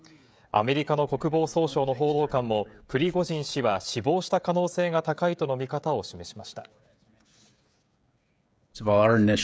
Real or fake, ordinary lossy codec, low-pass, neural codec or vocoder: fake; none; none; codec, 16 kHz, 16 kbps, FreqCodec, smaller model